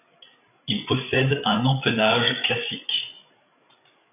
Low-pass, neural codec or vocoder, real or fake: 3.6 kHz; codec, 16 kHz, 8 kbps, FreqCodec, larger model; fake